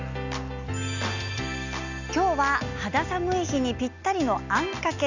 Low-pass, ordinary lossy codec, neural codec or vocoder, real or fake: 7.2 kHz; none; none; real